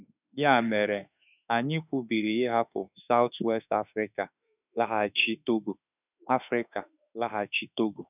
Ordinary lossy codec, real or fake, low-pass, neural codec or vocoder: none; fake; 3.6 kHz; autoencoder, 48 kHz, 32 numbers a frame, DAC-VAE, trained on Japanese speech